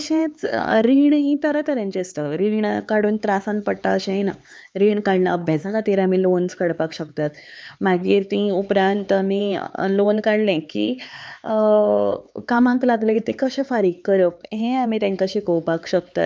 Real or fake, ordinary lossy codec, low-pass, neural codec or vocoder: fake; none; none; codec, 16 kHz, 4 kbps, X-Codec, HuBERT features, trained on LibriSpeech